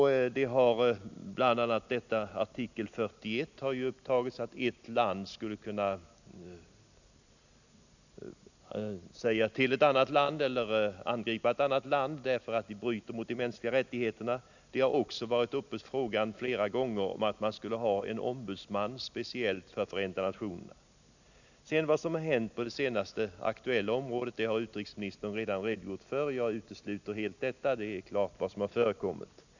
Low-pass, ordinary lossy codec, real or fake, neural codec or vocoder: 7.2 kHz; none; real; none